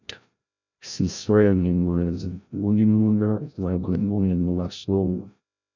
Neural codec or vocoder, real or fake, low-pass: codec, 16 kHz, 0.5 kbps, FreqCodec, larger model; fake; 7.2 kHz